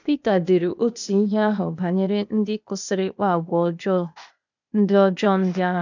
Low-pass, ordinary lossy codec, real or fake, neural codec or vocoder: 7.2 kHz; none; fake; codec, 16 kHz, 0.8 kbps, ZipCodec